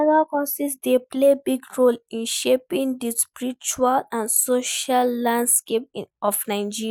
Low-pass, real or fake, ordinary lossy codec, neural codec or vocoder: none; real; none; none